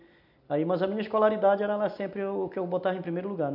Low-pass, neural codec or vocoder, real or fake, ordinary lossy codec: 5.4 kHz; none; real; none